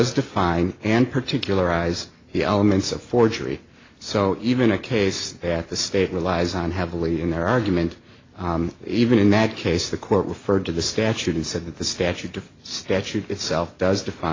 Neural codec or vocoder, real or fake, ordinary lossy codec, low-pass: none; real; AAC, 32 kbps; 7.2 kHz